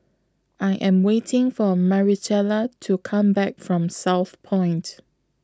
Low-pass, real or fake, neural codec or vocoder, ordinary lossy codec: none; real; none; none